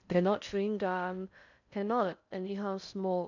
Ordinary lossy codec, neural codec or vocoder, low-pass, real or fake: none; codec, 16 kHz in and 24 kHz out, 0.6 kbps, FocalCodec, streaming, 4096 codes; 7.2 kHz; fake